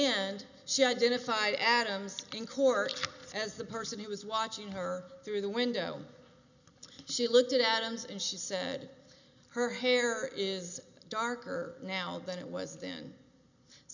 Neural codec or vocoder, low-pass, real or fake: none; 7.2 kHz; real